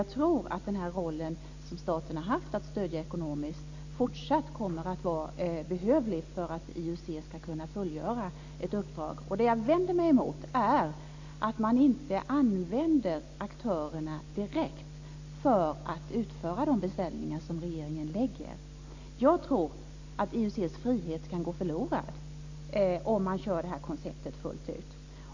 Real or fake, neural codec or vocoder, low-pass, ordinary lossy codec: real; none; 7.2 kHz; AAC, 48 kbps